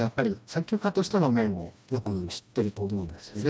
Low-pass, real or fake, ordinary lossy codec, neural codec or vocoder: none; fake; none; codec, 16 kHz, 1 kbps, FreqCodec, smaller model